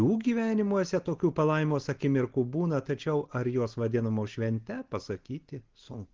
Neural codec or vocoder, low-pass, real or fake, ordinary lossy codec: none; 7.2 kHz; real; Opus, 16 kbps